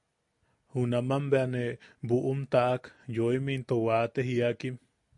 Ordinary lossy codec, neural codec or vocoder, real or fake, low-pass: AAC, 64 kbps; none; real; 10.8 kHz